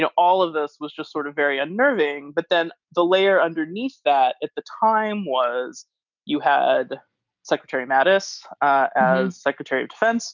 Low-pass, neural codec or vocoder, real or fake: 7.2 kHz; none; real